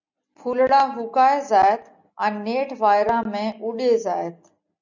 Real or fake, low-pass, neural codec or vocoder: real; 7.2 kHz; none